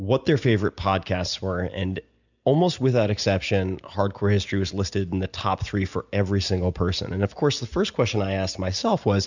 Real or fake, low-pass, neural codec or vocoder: real; 7.2 kHz; none